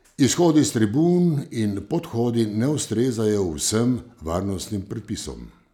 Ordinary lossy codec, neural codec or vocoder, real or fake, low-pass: none; none; real; 19.8 kHz